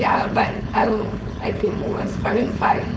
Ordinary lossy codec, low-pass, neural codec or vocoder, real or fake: none; none; codec, 16 kHz, 4.8 kbps, FACodec; fake